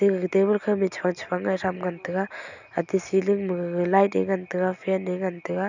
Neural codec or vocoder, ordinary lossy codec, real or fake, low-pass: none; none; real; 7.2 kHz